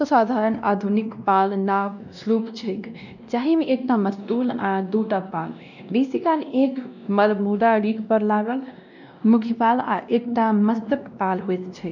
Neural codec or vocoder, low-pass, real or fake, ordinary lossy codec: codec, 16 kHz, 1 kbps, X-Codec, WavLM features, trained on Multilingual LibriSpeech; 7.2 kHz; fake; none